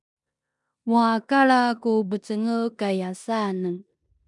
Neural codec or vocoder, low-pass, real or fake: codec, 16 kHz in and 24 kHz out, 0.9 kbps, LongCat-Audio-Codec, four codebook decoder; 10.8 kHz; fake